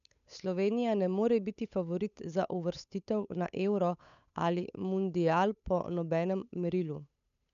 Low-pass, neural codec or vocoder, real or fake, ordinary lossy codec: 7.2 kHz; codec, 16 kHz, 8 kbps, FunCodec, trained on Chinese and English, 25 frames a second; fake; none